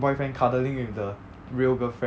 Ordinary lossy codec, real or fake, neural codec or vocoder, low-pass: none; real; none; none